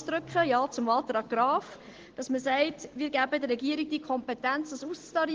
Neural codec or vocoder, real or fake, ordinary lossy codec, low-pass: none; real; Opus, 16 kbps; 7.2 kHz